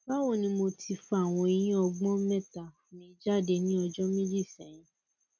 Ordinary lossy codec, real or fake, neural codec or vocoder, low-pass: none; real; none; 7.2 kHz